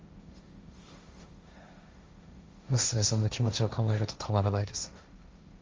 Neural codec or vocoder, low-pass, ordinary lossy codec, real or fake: codec, 16 kHz, 1.1 kbps, Voila-Tokenizer; 7.2 kHz; Opus, 32 kbps; fake